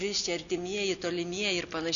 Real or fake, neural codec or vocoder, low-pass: real; none; 7.2 kHz